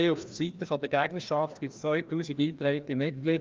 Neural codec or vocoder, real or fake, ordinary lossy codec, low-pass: codec, 16 kHz, 1 kbps, FreqCodec, larger model; fake; Opus, 32 kbps; 7.2 kHz